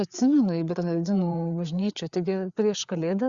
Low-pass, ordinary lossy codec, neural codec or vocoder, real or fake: 7.2 kHz; Opus, 64 kbps; codec, 16 kHz, 4 kbps, FreqCodec, larger model; fake